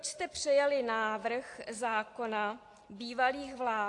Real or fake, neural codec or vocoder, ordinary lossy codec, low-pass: real; none; AAC, 48 kbps; 10.8 kHz